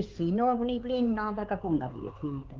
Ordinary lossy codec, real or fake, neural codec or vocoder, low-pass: Opus, 32 kbps; fake; codec, 16 kHz, 4 kbps, X-Codec, HuBERT features, trained on LibriSpeech; 7.2 kHz